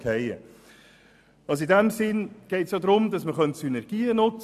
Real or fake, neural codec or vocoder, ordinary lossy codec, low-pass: real; none; none; 14.4 kHz